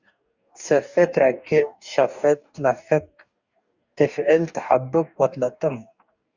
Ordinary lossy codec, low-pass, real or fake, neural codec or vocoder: Opus, 64 kbps; 7.2 kHz; fake; codec, 44.1 kHz, 2.6 kbps, DAC